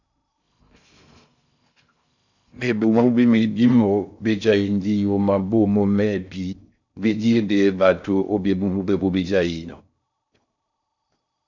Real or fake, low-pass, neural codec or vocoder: fake; 7.2 kHz; codec, 16 kHz in and 24 kHz out, 0.6 kbps, FocalCodec, streaming, 2048 codes